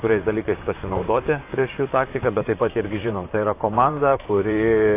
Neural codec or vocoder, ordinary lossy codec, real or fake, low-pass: vocoder, 44.1 kHz, 128 mel bands, Pupu-Vocoder; AAC, 24 kbps; fake; 3.6 kHz